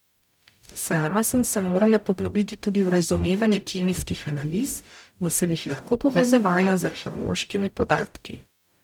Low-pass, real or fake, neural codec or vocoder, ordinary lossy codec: 19.8 kHz; fake; codec, 44.1 kHz, 0.9 kbps, DAC; none